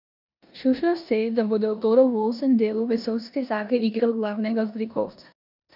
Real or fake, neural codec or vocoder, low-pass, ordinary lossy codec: fake; codec, 16 kHz in and 24 kHz out, 0.9 kbps, LongCat-Audio-Codec, four codebook decoder; 5.4 kHz; none